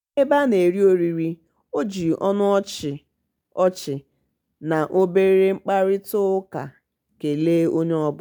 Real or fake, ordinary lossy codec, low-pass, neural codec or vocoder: real; MP3, 96 kbps; 19.8 kHz; none